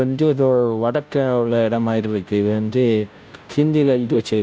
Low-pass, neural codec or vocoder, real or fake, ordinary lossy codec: none; codec, 16 kHz, 0.5 kbps, FunCodec, trained on Chinese and English, 25 frames a second; fake; none